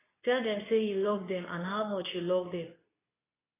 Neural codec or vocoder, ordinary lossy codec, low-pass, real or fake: codec, 24 kHz, 0.9 kbps, WavTokenizer, medium speech release version 2; AAC, 16 kbps; 3.6 kHz; fake